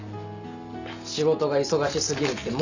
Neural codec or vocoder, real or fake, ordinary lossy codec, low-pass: none; real; none; 7.2 kHz